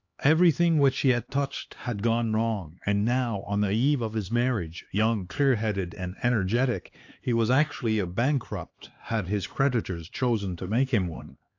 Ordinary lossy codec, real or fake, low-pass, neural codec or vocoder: AAC, 48 kbps; fake; 7.2 kHz; codec, 16 kHz, 2 kbps, X-Codec, HuBERT features, trained on LibriSpeech